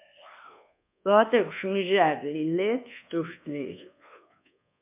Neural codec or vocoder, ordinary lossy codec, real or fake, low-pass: codec, 24 kHz, 1.2 kbps, DualCodec; MP3, 32 kbps; fake; 3.6 kHz